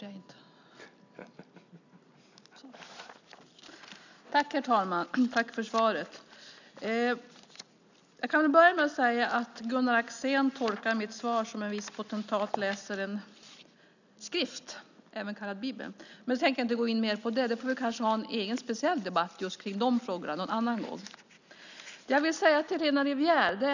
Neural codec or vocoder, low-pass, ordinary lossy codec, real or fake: none; 7.2 kHz; none; real